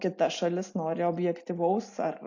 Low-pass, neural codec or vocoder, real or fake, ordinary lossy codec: 7.2 kHz; none; real; AAC, 48 kbps